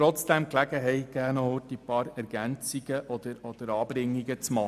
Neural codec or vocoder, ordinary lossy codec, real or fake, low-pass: none; none; real; 14.4 kHz